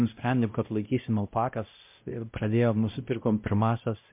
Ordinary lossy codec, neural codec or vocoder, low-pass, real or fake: MP3, 32 kbps; codec, 16 kHz, 0.5 kbps, X-Codec, WavLM features, trained on Multilingual LibriSpeech; 3.6 kHz; fake